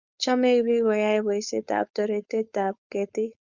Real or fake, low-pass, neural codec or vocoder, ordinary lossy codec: fake; 7.2 kHz; codec, 16 kHz, 4.8 kbps, FACodec; Opus, 64 kbps